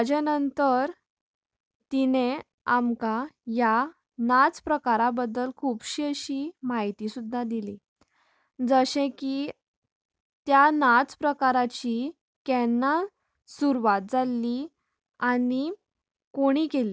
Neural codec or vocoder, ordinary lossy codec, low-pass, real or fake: none; none; none; real